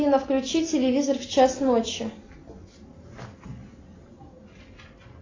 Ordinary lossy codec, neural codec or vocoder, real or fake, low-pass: AAC, 32 kbps; none; real; 7.2 kHz